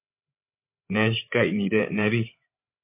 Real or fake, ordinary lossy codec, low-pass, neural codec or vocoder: fake; MP3, 24 kbps; 3.6 kHz; codec, 16 kHz, 16 kbps, FreqCodec, larger model